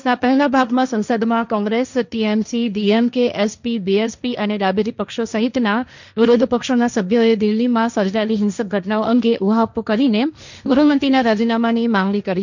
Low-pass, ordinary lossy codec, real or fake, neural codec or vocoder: 7.2 kHz; none; fake; codec, 16 kHz, 1.1 kbps, Voila-Tokenizer